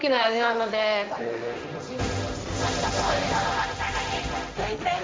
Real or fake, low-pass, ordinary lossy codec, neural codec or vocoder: fake; none; none; codec, 16 kHz, 1.1 kbps, Voila-Tokenizer